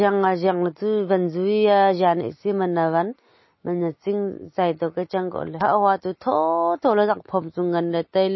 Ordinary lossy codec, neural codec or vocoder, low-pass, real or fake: MP3, 24 kbps; none; 7.2 kHz; real